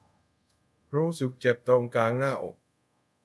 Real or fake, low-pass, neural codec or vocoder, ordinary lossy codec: fake; none; codec, 24 kHz, 0.5 kbps, DualCodec; none